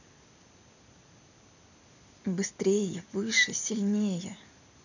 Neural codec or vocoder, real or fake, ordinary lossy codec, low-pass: none; real; none; 7.2 kHz